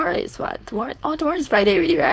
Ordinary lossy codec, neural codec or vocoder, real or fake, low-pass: none; codec, 16 kHz, 4.8 kbps, FACodec; fake; none